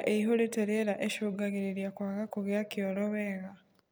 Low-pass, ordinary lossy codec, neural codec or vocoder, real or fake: none; none; none; real